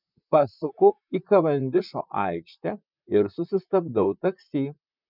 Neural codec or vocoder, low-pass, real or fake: vocoder, 44.1 kHz, 128 mel bands, Pupu-Vocoder; 5.4 kHz; fake